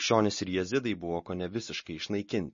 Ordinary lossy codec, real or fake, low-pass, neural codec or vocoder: MP3, 32 kbps; real; 7.2 kHz; none